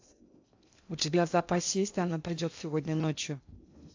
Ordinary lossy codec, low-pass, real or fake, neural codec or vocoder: AAC, 48 kbps; 7.2 kHz; fake; codec, 16 kHz in and 24 kHz out, 0.6 kbps, FocalCodec, streaming, 2048 codes